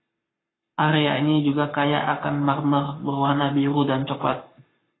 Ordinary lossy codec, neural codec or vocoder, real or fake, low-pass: AAC, 16 kbps; codec, 44.1 kHz, 7.8 kbps, Pupu-Codec; fake; 7.2 kHz